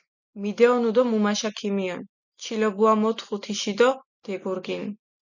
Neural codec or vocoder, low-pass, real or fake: none; 7.2 kHz; real